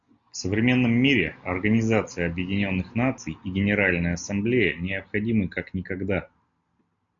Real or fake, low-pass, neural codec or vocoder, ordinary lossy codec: real; 7.2 kHz; none; MP3, 96 kbps